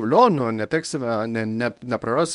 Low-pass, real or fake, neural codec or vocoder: 10.8 kHz; fake; codec, 24 kHz, 0.9 kbps, WavTokenizer, medium speech release version 1